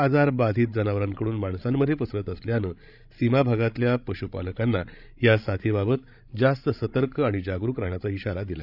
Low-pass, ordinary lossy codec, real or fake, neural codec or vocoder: 5.4 kHz; none; fake; codec, 16 kHz, 16 kbps, FreqCodec, larger model